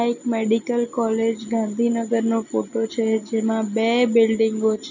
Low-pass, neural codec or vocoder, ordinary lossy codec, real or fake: 7.2 kHz; none; none; real